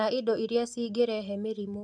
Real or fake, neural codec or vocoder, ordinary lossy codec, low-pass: real; none; MP3, 96 kbps; 9.9 kHz